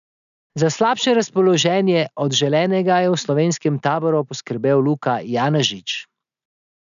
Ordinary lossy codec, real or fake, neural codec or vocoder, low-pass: none; real; none; 7.2 kHz